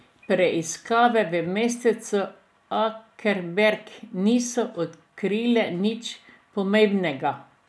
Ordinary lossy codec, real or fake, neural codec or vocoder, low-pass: none; real; none; none